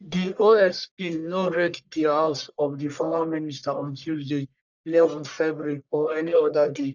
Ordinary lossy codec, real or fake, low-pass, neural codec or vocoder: none; fake; 7.2 kHz; codec, 44.1 kHz, 1.7 kbps, Pupu-Codec